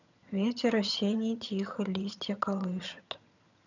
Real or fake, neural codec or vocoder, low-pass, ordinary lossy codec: fake; vocoder, 22.05 kHz, 80 mel bands, HiFi-GAN; 7.2 kHz; none